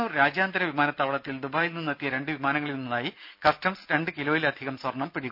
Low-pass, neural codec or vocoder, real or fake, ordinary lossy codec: 5.4 kHz; none; real; none